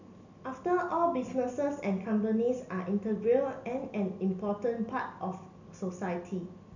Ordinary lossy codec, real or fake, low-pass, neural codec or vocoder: none; real; 7.2 kHz; none